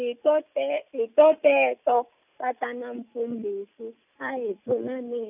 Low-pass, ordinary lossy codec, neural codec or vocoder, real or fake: 3.6 kHz; none; none; real